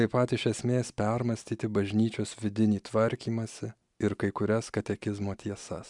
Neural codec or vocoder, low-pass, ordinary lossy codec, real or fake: none; 10.8 kHz; MP3, 96 kbps; real